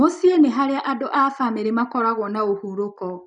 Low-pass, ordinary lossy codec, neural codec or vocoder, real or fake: 9.9 kHz; none; none; real